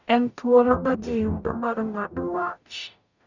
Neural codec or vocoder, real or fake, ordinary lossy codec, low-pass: codec, 44.1 kHz, 0.9 kbps, DAC; fake; none; 7.2 kHz